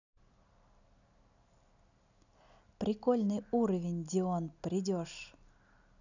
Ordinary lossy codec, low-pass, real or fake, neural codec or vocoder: none; 7.2 kHz; real; none